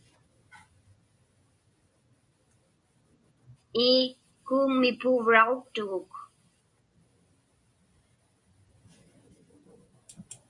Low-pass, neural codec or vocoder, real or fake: 10.8 kHz; none; real